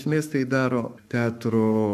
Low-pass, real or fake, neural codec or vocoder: 14.4 kHz; fake; codec, 44.1 kHz, 7.8 kbps, Pupu-Codec